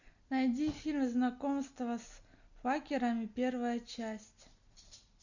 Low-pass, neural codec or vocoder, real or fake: 7.2 kHz; none; real